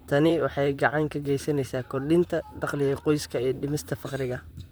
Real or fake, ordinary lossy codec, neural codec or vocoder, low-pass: fake; none; vocoder, 44.1 kHz, 128 mel bands, Pupu-Vocoder; none